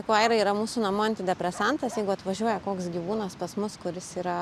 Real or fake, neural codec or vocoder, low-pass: real; none; 14.4 kHz